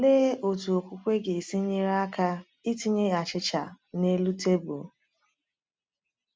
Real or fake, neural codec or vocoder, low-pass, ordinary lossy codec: real; none; none; none